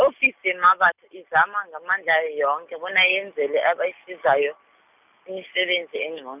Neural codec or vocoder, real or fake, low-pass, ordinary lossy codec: none; real; 3.6 kHz; none